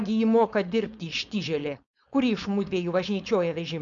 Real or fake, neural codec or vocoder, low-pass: fake; codec, 16 kHz, 4.8 kbps, FACodec; 7.2 kHz